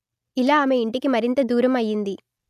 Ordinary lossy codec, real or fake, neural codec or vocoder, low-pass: none; real; none; 14.4 kHz